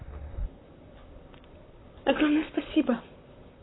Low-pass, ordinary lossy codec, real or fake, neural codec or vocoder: 7.2 kHz; AAC, 16 kbps; fake; vocoder, 44.1 kHz, 128 mel bands, Pupu-Vocoder